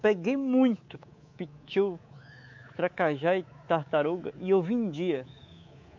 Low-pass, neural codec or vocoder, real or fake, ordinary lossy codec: 7.2 kHz; codec, 24 kHz, 3.1 kbps, DualCodec; fake; MP3, 48 kbps